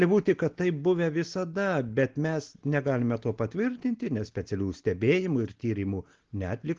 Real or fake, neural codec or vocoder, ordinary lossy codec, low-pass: real; none; Opus, 16 kbps; 7.2 kHz